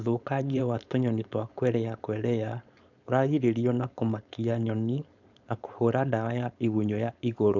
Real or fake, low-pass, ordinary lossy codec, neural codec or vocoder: fake; 7.2 kHz; none; codec, 16 kHz, 4.8 kbps, FACodec